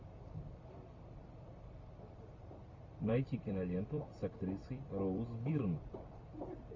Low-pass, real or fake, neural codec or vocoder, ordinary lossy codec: 7.2 kHz; fake; vocoder, 44.1 kHz, 128 mel bands every 256 samples, BigVGAN v2; MP3, 48 kbps